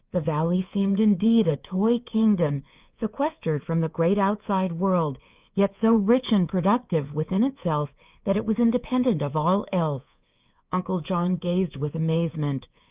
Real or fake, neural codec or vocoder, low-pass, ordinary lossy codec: fake; codec, 24 kHz, 3.1 kbps, DualCodec; 3.6 kHz; Opus, 24 kbps